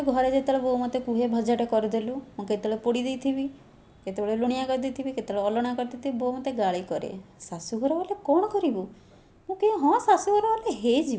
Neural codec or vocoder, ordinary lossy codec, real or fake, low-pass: none; none; real; none